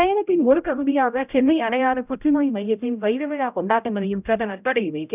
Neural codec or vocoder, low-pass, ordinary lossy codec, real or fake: codec, 16 kHz, 0.5 kbps, X-Codec, HuBERT features, trained on general audio; 3.6 kHz; none; fake